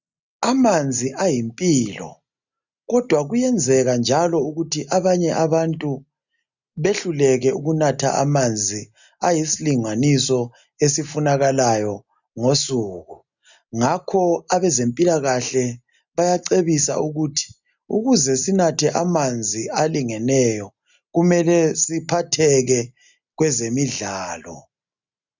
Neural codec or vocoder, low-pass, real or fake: none; 7.2 kHz; real